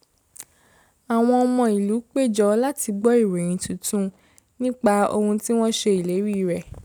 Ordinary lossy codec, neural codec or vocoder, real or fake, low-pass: none; none; real; none